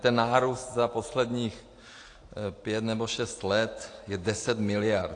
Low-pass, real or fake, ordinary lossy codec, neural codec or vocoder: 9.9 kHz; real; AAC, 48 kbps; none